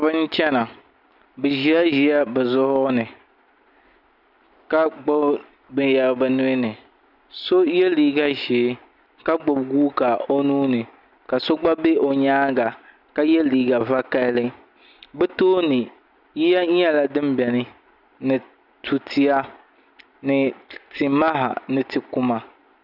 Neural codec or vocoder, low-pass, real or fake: none; 5.4 kHz; real